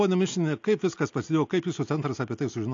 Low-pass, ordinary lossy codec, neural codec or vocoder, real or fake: 7.2 kHz; AAC, 48 kbps; none; real